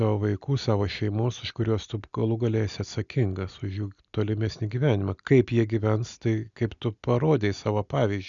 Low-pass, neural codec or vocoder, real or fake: 7.2 kHz; none; real